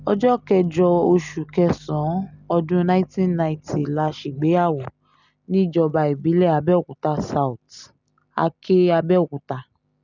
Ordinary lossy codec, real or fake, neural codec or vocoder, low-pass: none; real; none; 7.2 kHz